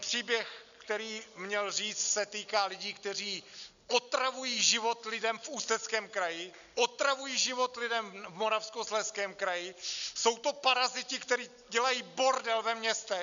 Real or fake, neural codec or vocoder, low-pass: real; none; 7.2 kHz